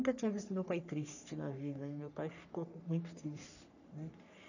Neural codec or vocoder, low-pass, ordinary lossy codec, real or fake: codec, 44.1 kHz, 3.4 kbps, Pupu-Codec; 7.2 kHz; none; fake